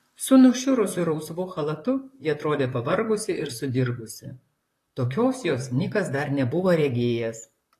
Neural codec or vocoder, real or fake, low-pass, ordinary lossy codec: vocoder, 44.1 kHz, 128 mel bands, Pupu-Vocoder; fake; 14.4 kHz; AAC, 48 kbps